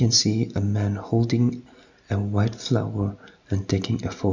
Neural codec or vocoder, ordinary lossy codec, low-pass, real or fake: none; none; 7.2 kHz; real